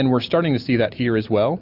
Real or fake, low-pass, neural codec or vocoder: real; 5.4 kHz; none